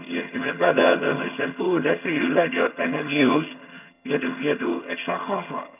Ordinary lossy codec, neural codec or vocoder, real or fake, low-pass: none; vocoder, 22.05 kHz, 80 mel bands, HiFi-GAN; fake; 3.6 kHz